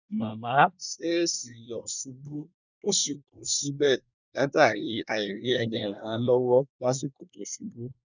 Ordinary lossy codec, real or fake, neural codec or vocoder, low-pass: none; fake; codec, 24 kHz, 1 kbps, SNAC; 7.2 kHz